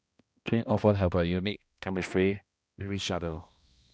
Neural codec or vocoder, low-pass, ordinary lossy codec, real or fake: codec, 16 kHz, 1 kbps, X-Codec, HuBERT features, trained on balanced general audio; none; none; fake